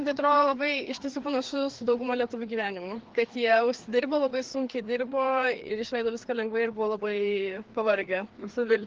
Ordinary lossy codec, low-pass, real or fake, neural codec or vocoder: Opus, 16 kbps; 7.2 kHz; fake; codec, 16 kHz, 2 kbps, FreqCodec, larger model